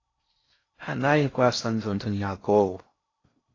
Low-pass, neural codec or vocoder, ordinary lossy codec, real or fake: 7.2 kHz; codec, 16 kHz in and 24 kHz out, 0.6 kbps, FocalCodec, streaming, 4096 codes; AAC, 32 kbps; fake